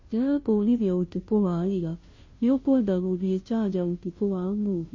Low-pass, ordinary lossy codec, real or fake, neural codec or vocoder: 7.2 kHz; MP3, 32 kbps; fake; codec, 16 kHz, 0.5 kbps, FunCodec, trained on Chinese and English, 25 frames a second